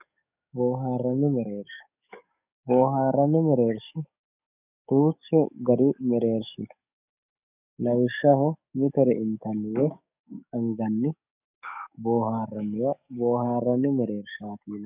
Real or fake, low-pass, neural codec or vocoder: fake; 3.6 kHz; codec, 44.1 kHz, 7.8 kbps, DAC